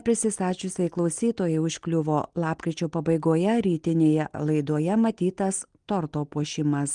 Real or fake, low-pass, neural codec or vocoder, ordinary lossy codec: real; 9.9 kHz; none; Opus, 24 kbps